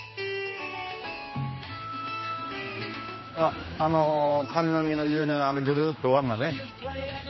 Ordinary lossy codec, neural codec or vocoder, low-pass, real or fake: MP3, 24 kbps; codec, 16 kHz, 2 kbps, X-Codec, HuBERT features, trained on general audio; 7.2 kHz; fake